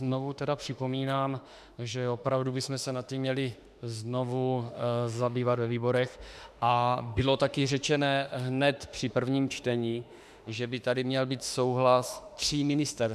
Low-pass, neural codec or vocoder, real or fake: 14.4 kHz; autoencoder, 48 kHz, 32 numbers a frame, DAC-VAE, trained on Japanese speech; fake